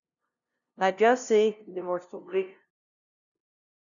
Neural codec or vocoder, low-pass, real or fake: codec, 16 kHz, 0.5 kbps, FunCodec, trained on LibriTTS, 25 frames a second; 7.2 kHz; fake